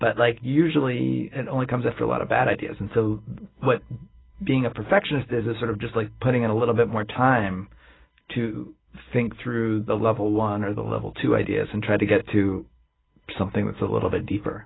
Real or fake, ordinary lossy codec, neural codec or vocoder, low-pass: real; AAC, 16 kbps; none; 7.2 kHz